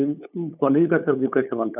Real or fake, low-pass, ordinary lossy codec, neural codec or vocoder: fake; 3.6 kHz; none; codec, 16 kHz, 8 kbps, FunCodec, trained on LibriTTS, 25 frames a second